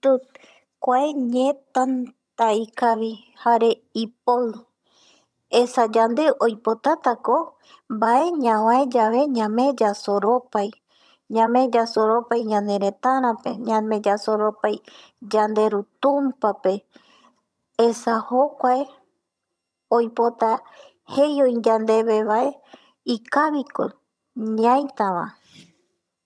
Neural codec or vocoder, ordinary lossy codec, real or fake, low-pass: vocoder, 22.05 kHz, 80 mel bands, HiFi-GAN; none; fake; none